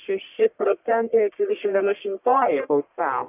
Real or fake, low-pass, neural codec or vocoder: fake; 3.6 kHz; codec, 44.1 kHz, 1.7 kbps, Pupu-Codec